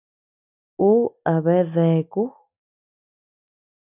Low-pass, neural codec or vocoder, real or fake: 3.6 kHz; none; real